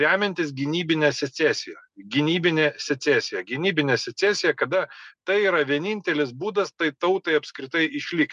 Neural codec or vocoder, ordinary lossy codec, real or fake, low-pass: none; MP3, 96 kbps; real; 10.8 kHz